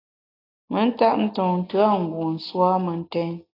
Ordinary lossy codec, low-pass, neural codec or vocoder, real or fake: AAC, 32 kbps; 5.4 kHz; none; real